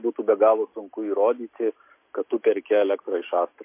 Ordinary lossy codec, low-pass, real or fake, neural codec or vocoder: MP3, 24 kbps; 3.6 kHz; real; none